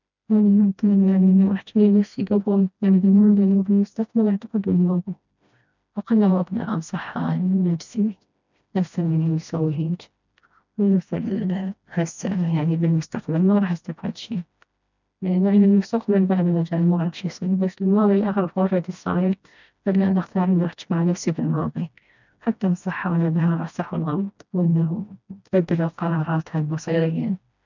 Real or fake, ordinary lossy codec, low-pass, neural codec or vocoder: fake; none; 7.2 kHz; codec, 16 kHz, 1 kbps, FreqCodec, smaller model